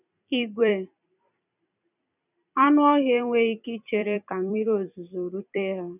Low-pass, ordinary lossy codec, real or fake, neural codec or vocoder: 3.6 kHz; none; fake; vocoder, 44.1 kHz, 128 mel bands every 256 samples, BigVGAN v2